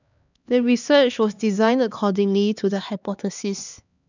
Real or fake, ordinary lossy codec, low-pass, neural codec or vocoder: fake; none; 7.2 kHz; codec, 16 kHz, 2 kbps, X-Codec, HuBERT features, trained on LibriSpeech